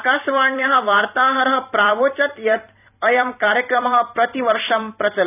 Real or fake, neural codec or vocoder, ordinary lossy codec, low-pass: fake; vocoder, 44.1 kHz, 128 mel bands every 256 samples, BigVGAN v2; none; 3.6 kHz